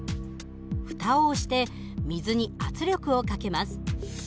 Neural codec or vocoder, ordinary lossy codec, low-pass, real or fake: none; none; none; real